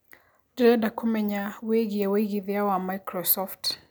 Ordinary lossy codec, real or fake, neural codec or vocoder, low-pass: none; real; none; none